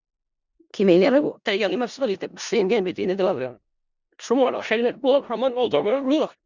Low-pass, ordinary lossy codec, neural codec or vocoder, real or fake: 7.2 kHz; Opus, 64 kbps; codec, 16 kHz in and 24 kHz out, 0.4 kbps, LongCat-Audio-Codec, four codebook decoder; fake